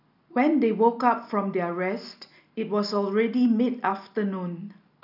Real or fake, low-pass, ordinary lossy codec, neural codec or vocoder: real; 5.4 kHz; none; none